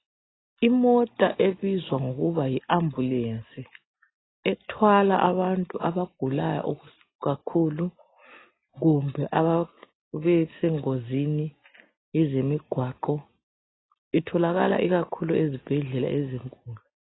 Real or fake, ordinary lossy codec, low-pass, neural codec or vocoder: real; AAC, 16 kbps; 7.2 kHz; none